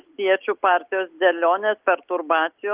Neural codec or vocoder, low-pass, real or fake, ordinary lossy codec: none; 3.6 kHz; real; Opus, 32 kbps